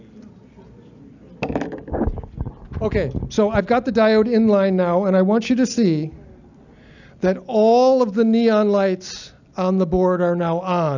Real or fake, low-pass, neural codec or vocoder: real; 7.2 kHz; none